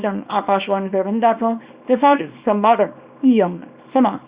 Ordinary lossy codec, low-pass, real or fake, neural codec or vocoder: Opus, 64 kbps; 3.6 kHz; fake; codec, 24 kHz, 0.9 kbps, WavTokenizer, small release